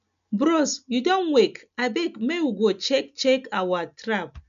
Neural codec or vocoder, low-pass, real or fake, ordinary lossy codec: none; 7.2 kHz; real; none